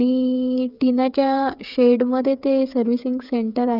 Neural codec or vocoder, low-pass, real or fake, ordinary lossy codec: codec, 16 kHz, 8 kbps, FreqCodec, smaller model; 5.4 kHz; fake; none